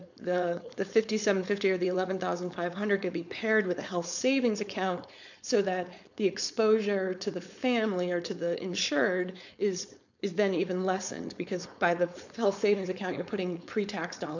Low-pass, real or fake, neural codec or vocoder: 7.2 kHz; fake; codec, 16 kHz, 4.8 kbps, FACodec